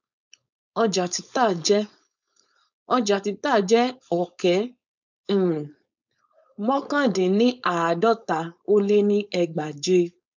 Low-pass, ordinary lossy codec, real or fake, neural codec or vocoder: 7.2 kHz; none; fake; codec, 16 kHz, 4.8 kbps, FACodec